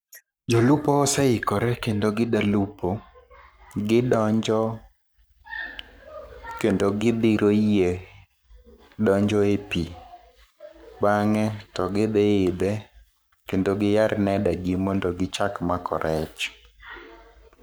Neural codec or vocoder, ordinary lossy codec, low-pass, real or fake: codec, 44.1 kHz, 7.8 kbps, Pupu-Codec; none; none; fake